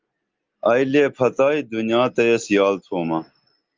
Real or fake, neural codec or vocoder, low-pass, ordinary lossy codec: real; none; 7.2 kHz; Opus, 32 kbps